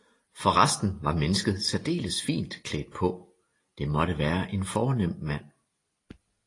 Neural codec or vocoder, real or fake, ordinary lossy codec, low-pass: none; real; AAC, 32 kbps; 10.8 kHz